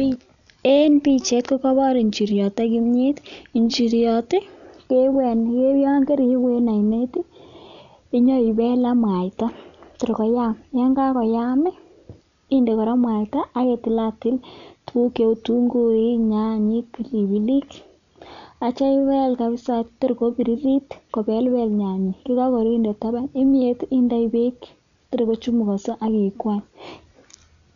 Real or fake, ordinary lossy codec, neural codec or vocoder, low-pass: real; none; none; 7.2 kHz